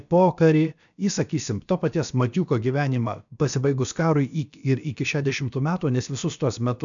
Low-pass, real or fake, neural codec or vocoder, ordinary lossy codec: 7.2 kHz; fake; codec, 16 kHz, about 1 kbps, DyCAST, with the encoder's durations; MP3, 96 kbps